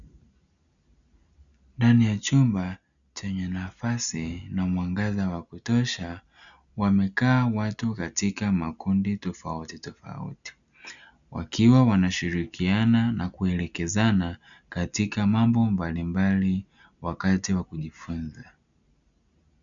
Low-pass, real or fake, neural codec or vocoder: 7.2 kHz; real; none